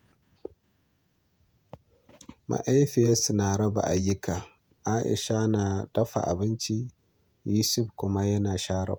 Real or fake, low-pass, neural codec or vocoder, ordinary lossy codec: fake; none; vocoder, 48 kHz, 128 mel bands, Vocos; none